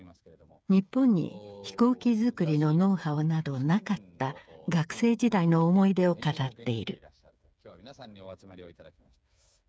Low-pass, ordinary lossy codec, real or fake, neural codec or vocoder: none; none; fake; codec, 16 kHz, 8 kbps, FreqCodec, smaller model